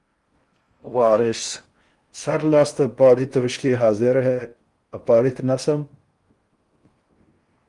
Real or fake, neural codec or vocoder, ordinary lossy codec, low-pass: fake; codec, 16 kHz in and 24 kHz out, 0.6 kbps, FocalCodec, streaming, 4096 codes; Opus, 32 kbps; 10.8 kHz